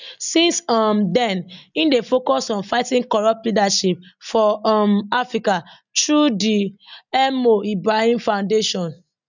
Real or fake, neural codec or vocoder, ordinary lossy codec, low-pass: real; none; none; 7.2 kHz